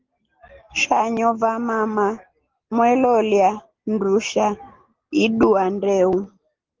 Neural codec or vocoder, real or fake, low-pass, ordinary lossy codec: none; real; 7.2 kHz; Opus, 16 kbps